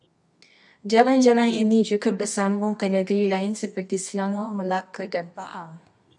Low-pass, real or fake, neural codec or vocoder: 10.8 kHz; fake; codec, 24 kHz, 0.9 kbps, WavTokenizer, medium music audio release